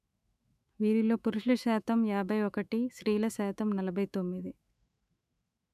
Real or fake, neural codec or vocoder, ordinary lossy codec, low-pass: fake; autoencoder, 48 kHz, 128 numbers a frame, DAC-VAE, trained on Japanese speech; none; 14.4 kHz